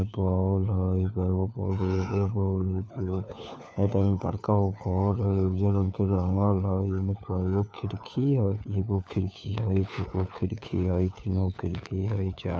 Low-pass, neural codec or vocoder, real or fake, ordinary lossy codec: none; codec, 16 kHz, 4 kbps, FunCodec, trained on LibriTTS, 50 frames a second; fake; none